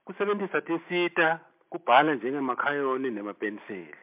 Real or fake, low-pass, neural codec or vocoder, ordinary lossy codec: real; 3.6 kHz; none; MP3, 32 kbps